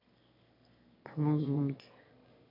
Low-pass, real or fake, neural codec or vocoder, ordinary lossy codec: 5.4 kHz; fake; autoencoder, 22.05 kHz, a latent of 192 numbers a frame, VITS, trained on one speaker; MP3, 32 kbps